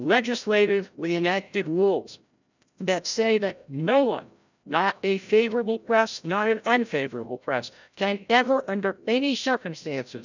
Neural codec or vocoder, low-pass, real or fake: codec, 16 kHz, 0.5 kbps, FreqCodec, larger model; 7.2 kHz; fake